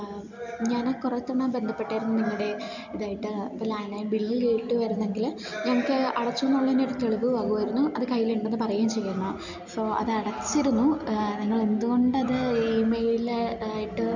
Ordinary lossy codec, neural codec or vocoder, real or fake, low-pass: none; none; real; 7.2 kHz